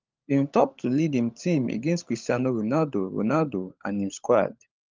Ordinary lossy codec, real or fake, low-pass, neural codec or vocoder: Opus, 24 kbps; fake; 7.2 kHz; codec, 16 kHz, 16 kbps, FunCodec, trained on LibriTTS, 50 frames a second